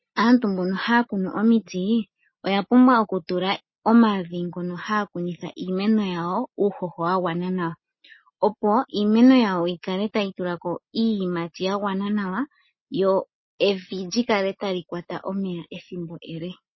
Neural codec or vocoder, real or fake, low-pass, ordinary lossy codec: none; real; 7.2 kHz; MP3, 24 kbps